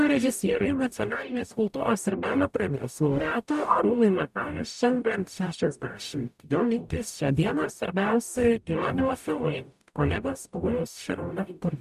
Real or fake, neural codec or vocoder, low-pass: fake; codec, 44.1 kHz, 0.9 kbps, DAC; 14.4 kHz